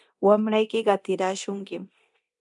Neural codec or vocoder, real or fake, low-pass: codec, 24 kHz, 0.9 kbps, DualCodec; fake; 10.8 kHz